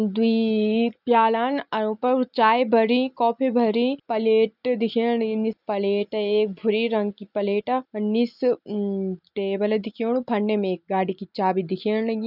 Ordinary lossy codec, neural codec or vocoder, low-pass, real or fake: none; none; 5.4 kHz; real